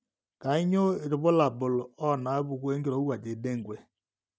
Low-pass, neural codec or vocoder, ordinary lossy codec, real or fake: none; none; none; real